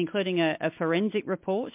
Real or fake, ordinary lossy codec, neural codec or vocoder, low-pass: real; MP3, 32 kbps; none; 3.6 kHz